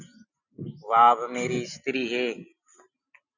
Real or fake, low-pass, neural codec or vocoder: real; 7.2 kHz; none